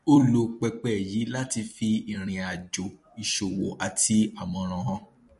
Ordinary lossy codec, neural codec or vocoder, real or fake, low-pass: MP3, 48 kbps; vocoder, 44.1 kHz, 128 mel bands every 256 samples, BigVGAN v2; fake; 14.4 kHz